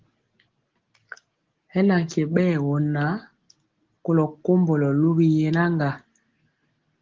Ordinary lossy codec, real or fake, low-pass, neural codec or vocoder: Opus, 16 kbps; real; 7.2 kHz; none